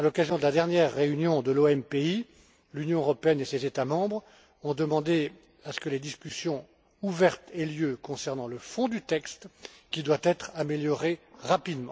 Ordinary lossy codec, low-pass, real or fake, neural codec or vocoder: none; none; real; none